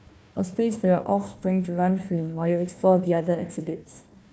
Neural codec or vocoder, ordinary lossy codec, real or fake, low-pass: codec, 16 kHz, 1 kbps, FunCodec, trained on Chinese and English, 50 frames a second; none; fake; none